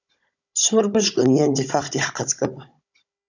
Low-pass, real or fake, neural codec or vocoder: 7.2 kHz; fake; codec, 16 kHz, 16 kbps, FunCodec, trained on Chinese and English, 50 frames a second